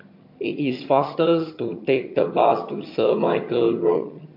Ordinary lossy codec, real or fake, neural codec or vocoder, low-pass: MP3, 32 kbps; fake; vocoder, 22.05 kHz, 80 mel bands, HiFi-GAN; 5.4 kHz